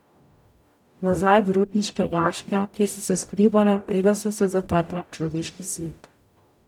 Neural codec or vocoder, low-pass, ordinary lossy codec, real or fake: codec, 44.1 kHz, 0.9 kbps, DAC; 19.8 kHz; none; fake